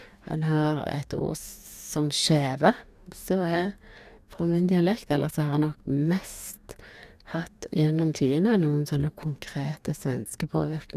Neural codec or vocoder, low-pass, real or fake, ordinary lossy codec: codec, 44.1 kHz, 2.6 kbps, DAC; 14.4 kHz; fake; none